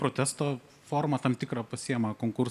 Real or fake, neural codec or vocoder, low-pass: real; none; 14.4 kHz